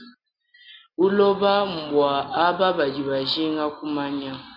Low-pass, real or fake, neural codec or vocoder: 5.4 kHz; real; none